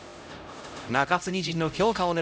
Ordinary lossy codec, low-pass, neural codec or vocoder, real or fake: none; none; codec, 16 kHz, 0.5 kbps, X-Codec, HuBERT features, trained on LibriSpeech; fake